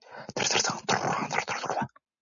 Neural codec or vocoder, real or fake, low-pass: none; real; 7.2 kHz